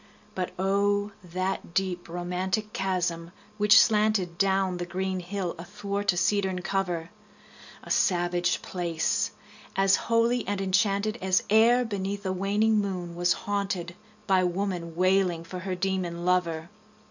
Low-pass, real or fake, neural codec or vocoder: 7.2 kHz; real; none